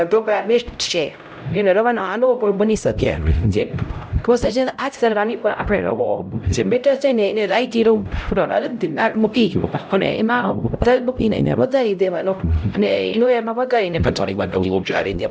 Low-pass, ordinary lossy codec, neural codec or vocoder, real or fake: none; none; codec, 16 kHz, 0.5 kbps, X-Codec, HuBERT features, trained on LibriSpeech; fake